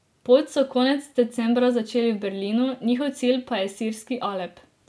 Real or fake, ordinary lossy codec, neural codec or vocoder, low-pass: real; none; none; none